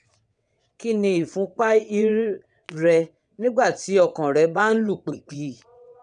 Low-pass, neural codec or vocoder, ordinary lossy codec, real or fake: 9.9 kHz; vocoder, 22.05 kHz, 80 mel bands, WaveNeXt; none; fake